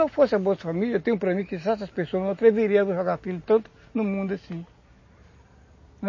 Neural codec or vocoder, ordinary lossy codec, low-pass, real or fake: none; MP3, 32 kbps; 7.2 kHz; real